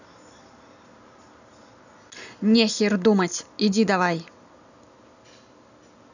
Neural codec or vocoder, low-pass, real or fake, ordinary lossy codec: none; 7.2 kHz; real; none